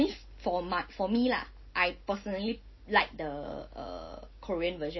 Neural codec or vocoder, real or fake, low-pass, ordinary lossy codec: none; real; 7.2 kHz; MP3, 24 kbps